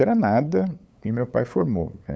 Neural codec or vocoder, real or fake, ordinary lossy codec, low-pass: codec, 16 kHz, 8 kbps, FunCodec, trained on LibriTTS, 25 frames a second; fake; none; none